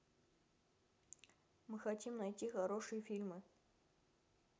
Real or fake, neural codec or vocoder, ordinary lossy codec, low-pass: real; none; none; none